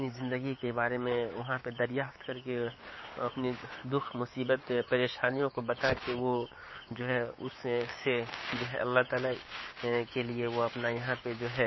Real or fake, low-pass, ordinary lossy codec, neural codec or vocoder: fake; 7.2 kHz; MP3, 24 kbps; codec, 16 kHz, 6 kbps, DAC